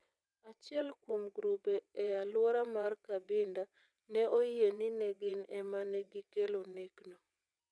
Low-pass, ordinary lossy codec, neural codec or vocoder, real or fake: none; none; vocoder, 22.05 kHz, 80 mel bands, WaveNeXt; fake